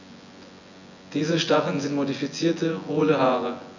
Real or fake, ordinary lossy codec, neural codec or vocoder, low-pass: fake; none; vocoder, 24 kHz, 100 mel bands, Vocos; 7.2 kHz